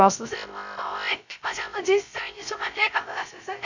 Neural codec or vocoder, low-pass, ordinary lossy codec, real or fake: codec, 16 kHz, 0.3 kbps, FocalCodec; 7.2 kHz; none; fake